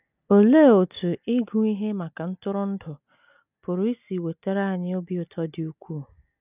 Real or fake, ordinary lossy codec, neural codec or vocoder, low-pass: real; none; none; 3.6 kHz